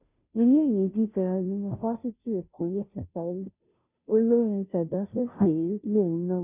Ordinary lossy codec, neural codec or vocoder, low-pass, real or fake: none; codec, 16 kHz, 0.5 kbps, FunCodec, trained on Chinese and English, 25 frames a second; 3.6 kHz; fake